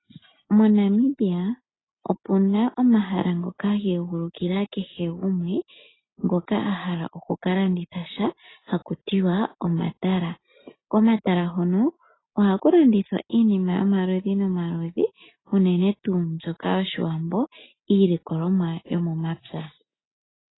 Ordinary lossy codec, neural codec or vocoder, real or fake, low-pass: AAC, 16 kbps; none; real; 7.2 kHz